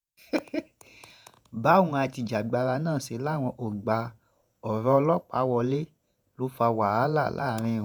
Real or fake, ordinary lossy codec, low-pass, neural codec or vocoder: fake; none; none; vocoder, 48 kHz, 128 mel bands, Vocos